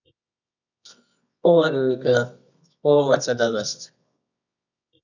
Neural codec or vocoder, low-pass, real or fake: codec, 24 kHz, 0.9 kbps, WavTokenizer, medium music audio release; 7.2 kHz; fake